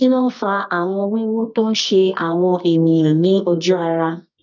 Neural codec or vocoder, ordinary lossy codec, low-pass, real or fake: codec, 24 kHz, 0.9 kbps, WavTokenizer, medium music audio release; none; 7.2 kHz; fake